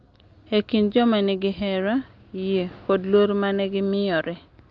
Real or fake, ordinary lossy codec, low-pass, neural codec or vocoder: real; Opus, 32 kbps; 7.2 kHz; none